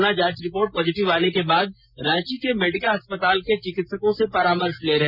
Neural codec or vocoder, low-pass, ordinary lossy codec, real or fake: none; 5.4 kHz; Opus, 64 kbps; real